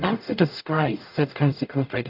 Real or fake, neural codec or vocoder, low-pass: fake; codec, 44.1 kHz, 0.9 kbps, DAC; 5.4 kHz